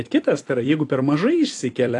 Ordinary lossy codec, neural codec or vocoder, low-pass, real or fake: AAC, 48 kbps; vocoder, 44.1 kHz, 128 mel bands every 256 samples, BigVGAN v2; 10.8 kHz; fake